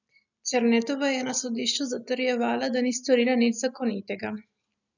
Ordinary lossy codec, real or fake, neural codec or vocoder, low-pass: none; real; none; 7.2 kHz